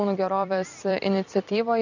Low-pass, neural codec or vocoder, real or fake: 7.2 kHz; vocoder, 44.1 kHz, 128 mel bands every 256 samples, BigVGAN v2; fake